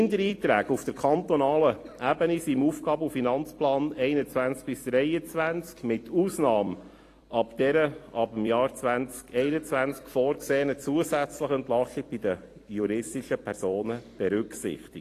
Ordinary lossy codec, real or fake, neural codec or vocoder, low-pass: AAC, 48 kbps; fake; vocoder, 44.1 kHz, 128 mel bands every 256 samples, BigVGAN v2; 14.4 kHz